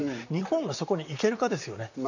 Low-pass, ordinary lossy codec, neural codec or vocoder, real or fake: 7.2 kHz; none; vocoder, 44.1 kHz, 128 mel bands, Pupu-Vocoder; fake